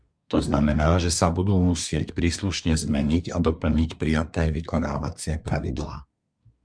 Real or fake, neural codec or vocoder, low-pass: fake; codec, 24 kHz, 1 kbps, SNAC; 9.9 kHz